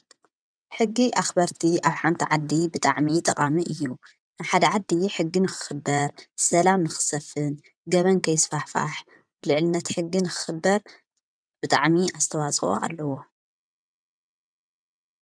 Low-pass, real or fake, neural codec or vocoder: 9.9 kHz; fake; vocoder, 22.05 kHz, 80 mel bands, WaveNeXt